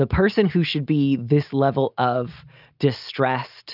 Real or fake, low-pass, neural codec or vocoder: real; 5.4 kHz; none